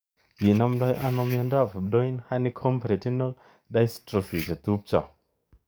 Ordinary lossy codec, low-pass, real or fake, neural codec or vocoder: none; none; fake; codec, 44.1 kHz, 7.8 kbps, DAC